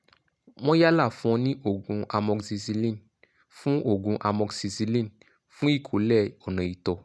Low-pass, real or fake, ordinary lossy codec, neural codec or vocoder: none; real; none; none